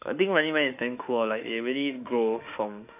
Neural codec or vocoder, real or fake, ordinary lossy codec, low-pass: autoencoder, 48 kHz, 32 numbers a frame, DAC-VAE, trained on Japanese speech; fake; none; 3.6 kHz